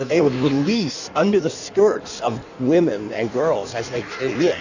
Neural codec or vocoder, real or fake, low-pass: codec, 16 kHz in and 24 kHz out, 1.1 kbps, FireRedTTS-2 codec; fake; 7.2 kHz